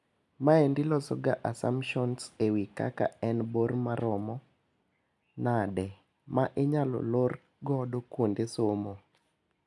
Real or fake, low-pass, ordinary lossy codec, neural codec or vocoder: real; none; none; none